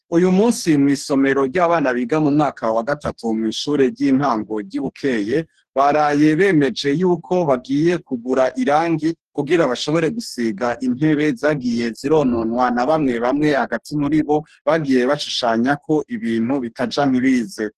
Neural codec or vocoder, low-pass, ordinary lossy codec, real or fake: codec, 44.1 kHz, 2.6 kbps, DAC; 14.4 kHz; Opus, 16 kbps; fake